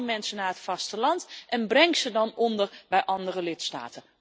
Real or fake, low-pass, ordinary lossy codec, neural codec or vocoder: real; none; none; none